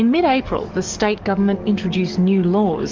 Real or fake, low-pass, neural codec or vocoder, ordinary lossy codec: fake; 7.2 kHz; codec, 44.1 kHz, 7.8 kbps, DAC; Opus, 32 kbps